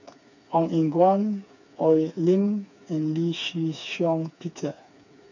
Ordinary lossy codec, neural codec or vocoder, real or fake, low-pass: none; codec, 16 kHz, 4 kbps, FreqCodec, smaller model; fake; 7.2 kHz